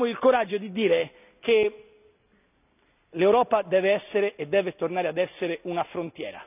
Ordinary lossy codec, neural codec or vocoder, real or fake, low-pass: none; none; real; 3.6 kHz